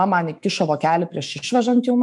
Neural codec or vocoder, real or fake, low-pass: autoencoder, 48 kHz, 128 numbers a frame, DAC-VAE, trained on Japanese speech; fake; 10.8 kHz